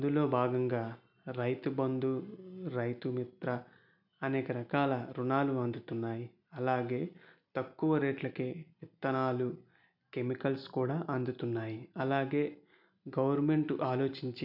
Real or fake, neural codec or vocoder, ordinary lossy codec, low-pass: real; none; none; 5.4 kHz